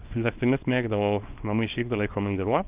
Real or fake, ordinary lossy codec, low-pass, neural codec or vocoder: fake; Opus, 24 kbps; 3.6 kHz; codec, 24 kHz, 0.9 kbps, WavTokenizer, small release